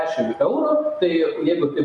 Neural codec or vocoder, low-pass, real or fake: none; 10.8 kHz; real